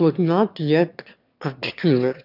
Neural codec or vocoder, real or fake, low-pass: autoencoder, 22.05 kHz, a latent of 192 numbers a frame, VITS, trained on one speaker; fake; 5.4 kHz